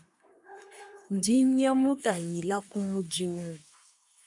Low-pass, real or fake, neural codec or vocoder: 10.8 kHz; fake; codec, 24 kHz, 1 kbps, SNAC